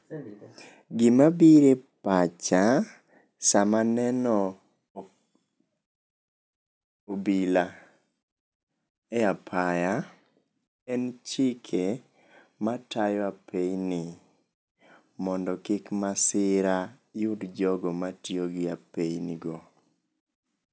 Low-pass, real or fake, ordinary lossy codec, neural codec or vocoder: none; real; none; none